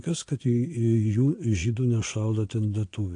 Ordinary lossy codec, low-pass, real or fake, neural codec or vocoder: AAC, 48 kbps; 9.9 kHz; fake; vocoder, 22.05 kHz, 80 mel bands, Vocos